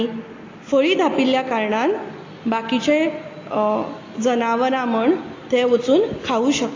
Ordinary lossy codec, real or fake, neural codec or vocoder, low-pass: AAC, 32 kbps; real; none; 7.2 kHz